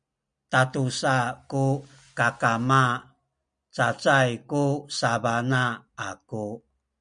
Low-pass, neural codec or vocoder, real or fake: 9.9 kHz; none; real